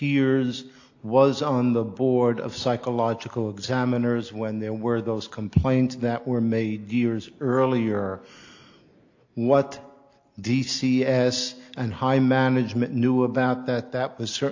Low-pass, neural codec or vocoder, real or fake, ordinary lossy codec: 7.2 kHz; none; real; AAC, 48 kbps